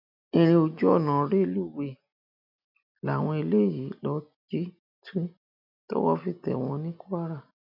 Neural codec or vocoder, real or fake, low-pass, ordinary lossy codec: none; real; 5.4 kHz; MP3, 48 kbps